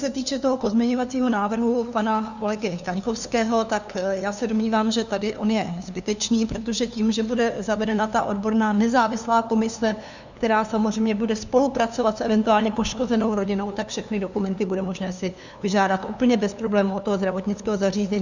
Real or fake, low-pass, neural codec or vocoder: fake; 7.2 kHz; codec, 16 kHz, 2 kbps, FunCodec, trained on LibriTTS, 25 frames a second